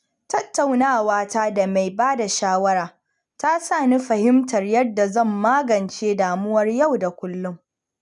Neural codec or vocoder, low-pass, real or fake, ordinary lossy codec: none; 10.8 kHz; real; none